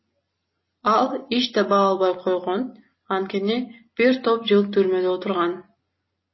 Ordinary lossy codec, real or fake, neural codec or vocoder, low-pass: MP3, 24 kbps; real; none; 7.2 kHz